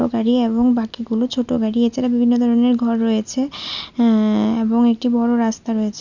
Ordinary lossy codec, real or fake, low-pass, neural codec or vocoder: none; real; 7.2 kHz; none